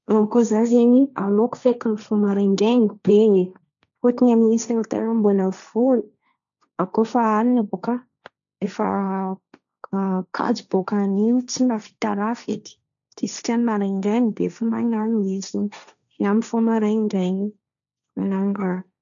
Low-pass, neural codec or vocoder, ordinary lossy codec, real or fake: 7.2 kHz; codec, 16 kHz, 1.1 kbps, Voila-Tokenizer; none; fake